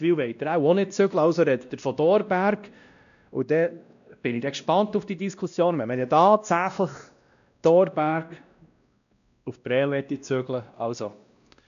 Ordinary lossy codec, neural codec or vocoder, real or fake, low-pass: none; codec, 16 kHz, 1 kbps, X-Codec, WavLM features, trained on Multilingual LibriSpeech; fake; 7.2 kHz